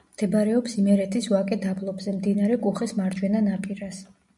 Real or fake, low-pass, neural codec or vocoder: real; 10.8 kHz; none